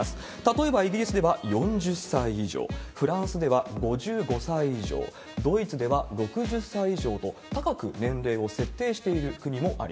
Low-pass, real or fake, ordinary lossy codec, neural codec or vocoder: none; real; none; none